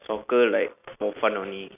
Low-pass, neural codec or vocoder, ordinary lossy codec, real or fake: 3.6 kHz; none; none; real